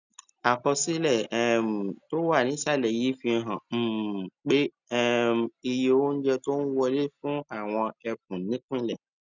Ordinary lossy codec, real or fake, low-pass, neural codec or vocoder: none; real; 7.2 kHz; none